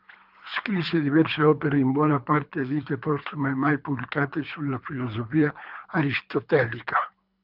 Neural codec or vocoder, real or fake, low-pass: codec, 24 kHz, 3 kbps, HILCodec; fake; 5.4 kHz